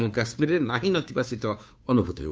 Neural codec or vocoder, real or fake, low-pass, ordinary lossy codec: codec, 16 kHz, 2 kbps, FunCodec, trained on Chinese and English, 25 frames a second; fake; none; none